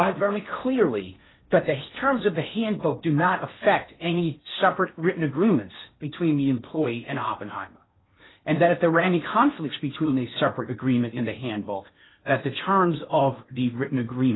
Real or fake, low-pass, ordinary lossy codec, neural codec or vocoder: fake; 7.2 kHz; AAC, 16 kbps; codec, 16 kHz in and 24 kHz out, 0.6 kbps, FocalCodec, streaming, 4096 codes